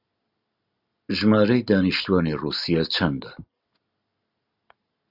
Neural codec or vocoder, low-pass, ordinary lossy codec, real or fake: none; 5.4 kHz; Opus, 64 kbps; real